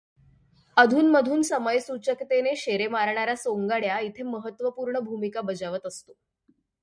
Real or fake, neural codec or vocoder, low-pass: real; none; 9.9 kHz